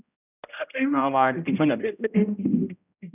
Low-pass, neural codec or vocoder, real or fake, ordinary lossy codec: 3.6 kHz; codec, 16 kHz, 0.5 kbps, X-Codec, HuBERT features, trained on general audio; fake; none